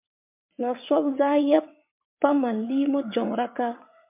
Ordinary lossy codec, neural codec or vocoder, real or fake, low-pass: MP3, 32 kbps; vocoder, 22.05 kHz, 80 mel bands, WaveNeXt; fake; 3.6 kHz